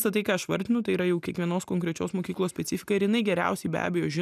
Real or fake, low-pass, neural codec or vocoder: real; 14.4 kHz; none